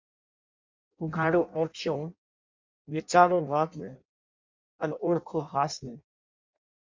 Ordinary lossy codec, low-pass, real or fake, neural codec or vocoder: MP3, 64 kbps; 7.2 kHz; fake; codec, 16 kHz in and 24 kHz out, 0.6 kbps, FireRedTTS-2 codec